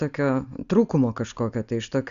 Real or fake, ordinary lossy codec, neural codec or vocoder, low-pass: real; Opus, 64 kbps; none; 7.2 kHz